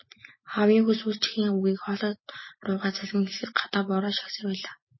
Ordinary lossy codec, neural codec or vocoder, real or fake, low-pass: MP3, 24 kbps; none; real; 7.2 kHz